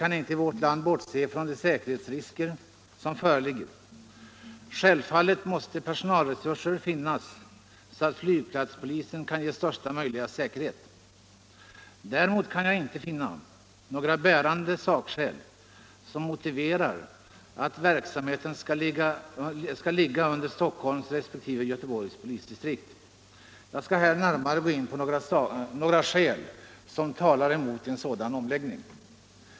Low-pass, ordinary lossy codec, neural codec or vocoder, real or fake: none; none; none; real